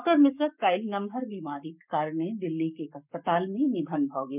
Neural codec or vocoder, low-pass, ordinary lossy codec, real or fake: codec, 44.1 kHz, 7.8 kbps, Pupu-Codec; 3.6 kHz; none; fake